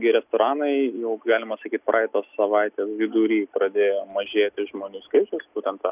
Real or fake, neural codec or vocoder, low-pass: real; none; 3.6 kHz